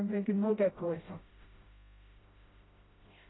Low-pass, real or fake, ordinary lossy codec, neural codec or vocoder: 7.2 kHz; fake; AAC, 16 kbps; codec, 16 kHz, 0.5 kbps, FreqCodec, smaller model